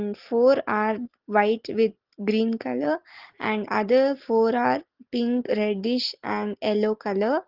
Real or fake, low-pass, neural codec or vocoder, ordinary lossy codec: real; 5.4 kHz; none; Opus, 16 kbps